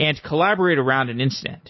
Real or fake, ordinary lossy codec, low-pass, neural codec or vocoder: real; MP3, 24 kbps; 7.2 kHz; none